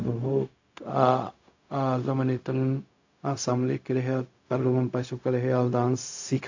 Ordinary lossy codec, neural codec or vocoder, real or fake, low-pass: AAC, 48 kbps; codec, 16 kHz, 0.4 kbps, LongCat-Audio-Codec; fake; 7.2 kHz